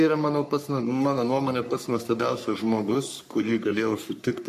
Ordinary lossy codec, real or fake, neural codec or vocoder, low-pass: MP3, 64 kbps; fake; codec, 44.1 kHz, 3.4 kbps, Pupu-Codec; 14.4 kHz